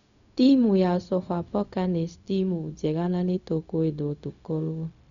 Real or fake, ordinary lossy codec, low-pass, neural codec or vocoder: fake; none; 7.2 kHz; codec, 16 kHz, 0.4 kbps, LongCat-Audio-Codec